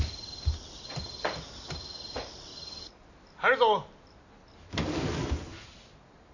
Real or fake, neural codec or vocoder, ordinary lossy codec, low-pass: real; none; none; 7.2 kHz